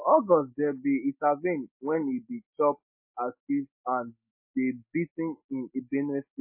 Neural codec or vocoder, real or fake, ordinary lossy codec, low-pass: none; real; MP3, 24 kbps; 3.6 kHz